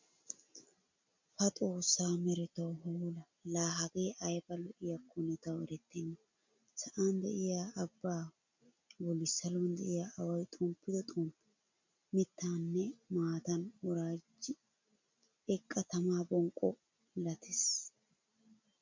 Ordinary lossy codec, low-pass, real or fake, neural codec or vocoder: MP3, 64 kbps; 7.2 kHz; real; none